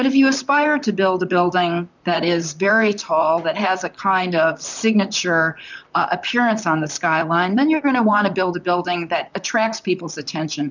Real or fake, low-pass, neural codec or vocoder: fake; 7.2 kHz; vocoder, 44.1 kHz, 128 mel bands, Pupu-Vocoder